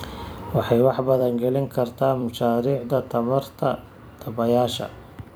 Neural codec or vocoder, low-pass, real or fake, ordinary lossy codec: vocoder, 44.1 kHz, 128 mel bands every 256 samples, BigVGAN v2; none; fake; none